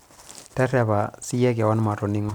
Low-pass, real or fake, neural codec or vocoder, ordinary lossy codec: none; real; none; none